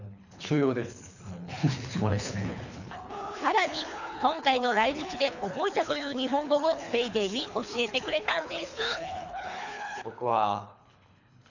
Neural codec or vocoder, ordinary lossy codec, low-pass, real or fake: codec, 24 kHz, 3 kbps, HILCodec; none; 7.2 kHz; fake